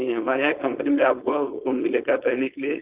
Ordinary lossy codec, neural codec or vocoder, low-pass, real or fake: Opus, 32 kbps; codec, 16 kHz, 4.8 kbps, FACodec; 3.6 kHz; fake